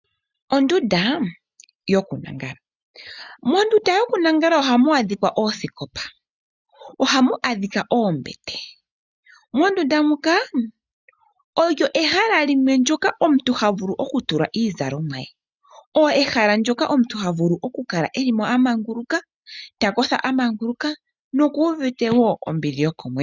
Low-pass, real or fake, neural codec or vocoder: 7.2 kHz; real; none